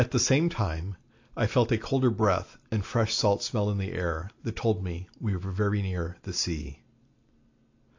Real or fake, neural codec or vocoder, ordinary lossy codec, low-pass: real; none; AAC, 48 kbps; 7.2 kHz